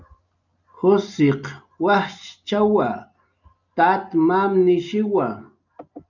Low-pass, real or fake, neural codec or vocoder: 7.2 kHz; real; none